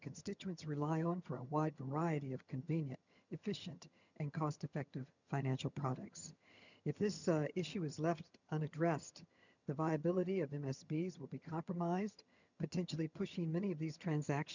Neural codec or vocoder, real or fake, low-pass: vocoder, 22.05 kHz, 80 mel bands, HiFi-GAN; fake; 7.2 kHz